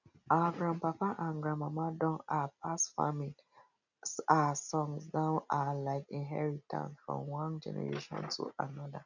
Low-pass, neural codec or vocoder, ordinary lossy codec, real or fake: 7.2 kHz; none; none; real